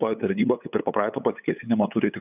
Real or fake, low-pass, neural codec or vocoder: fake; 3.6 kHz; codec, 16 kHz, 8 kbps, FunCodec, trained on LibriTTS, 25 frames a second